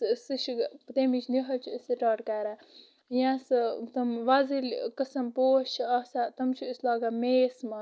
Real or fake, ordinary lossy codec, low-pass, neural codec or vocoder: real; none; none; none